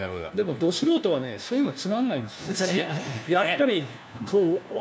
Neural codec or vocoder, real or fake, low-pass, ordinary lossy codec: codec, 16 kHz, 1 kbps, FunCodec, trained on LibriTTS, 50 frames a second; fake; none; none